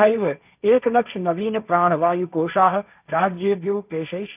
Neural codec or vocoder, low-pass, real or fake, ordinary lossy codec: codec, 16 kHz, 1.1 kbps, Voila-Tokenizer; 3.6 kHz; fake; none